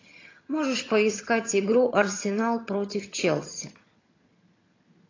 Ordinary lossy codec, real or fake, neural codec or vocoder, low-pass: AAC, 32 kbps; fake; vocoder, 22.05 kHz, 80 mel bands, HiFi-GAN; 7.2 kHz